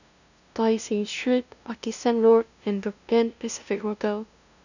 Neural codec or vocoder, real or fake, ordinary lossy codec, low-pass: codec, 16 kHz, 0.5 kbps, FunCodec, trained on LibriTTS, 25 frames a second; fake; none; 7.2 kHz